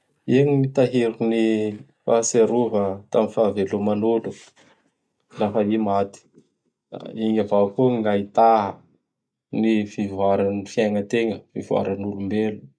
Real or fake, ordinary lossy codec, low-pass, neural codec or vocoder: real; none; none; none